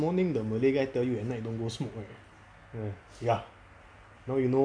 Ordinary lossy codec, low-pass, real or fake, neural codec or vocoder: none; 9.9 kHz; real; none